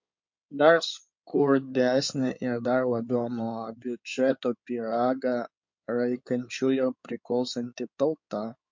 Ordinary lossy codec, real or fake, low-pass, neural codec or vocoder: MP3, 48 kbps; fake; 7.2 kHz; codec, 16 kHz in and 24 kHz out, 2.2 kbps, FireRedTTS-2 codec